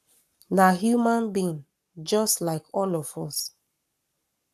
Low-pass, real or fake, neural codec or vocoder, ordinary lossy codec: 14.4 kHz; fake; codec, 44.1 kHz, 7.8 kbps, Pupu-Codec; none